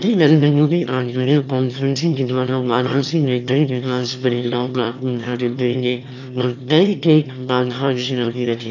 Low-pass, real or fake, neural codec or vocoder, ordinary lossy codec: 7.2 kHz; fake; autoencoder, 22.05 kHz, a latent of 192 numbers a frame, VITS, trained on one speaker; none